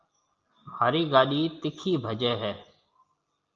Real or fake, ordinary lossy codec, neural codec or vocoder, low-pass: real; Opus, 24 kbps; none; 7.2 kHz